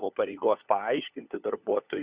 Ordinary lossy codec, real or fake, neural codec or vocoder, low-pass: Opus, 64 kbps; fake; codec, 16 kHz, 4 kbps, FunCodec, trained on LibriTTS, 50 frames a second; 3.6 kHz